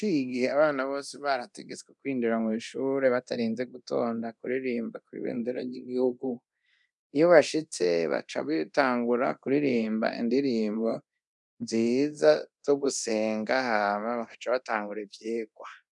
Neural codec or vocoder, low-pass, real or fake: codec, 24 kHz, 0.9 kbps, DualCodec; 10.8 kHz; fake